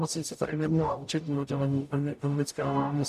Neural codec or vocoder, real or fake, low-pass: codec, 44.1 kHz, 0.9 kbps, DAC; fake; 14.4 kHz